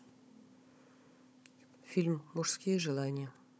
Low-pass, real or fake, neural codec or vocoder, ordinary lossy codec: none; fake; codec, 16 kHz, 16 kbps, FunCodec, trained on Chinese and English, 50 frames a second; none